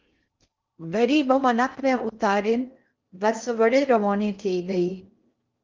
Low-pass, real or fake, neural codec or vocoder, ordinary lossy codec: 7.2 kHz; fake; codec, 16 kHz in and 24 kHz out, 0.6 kbps, FocalCodec, streaming, 4096 codes; Opus, 24 kbps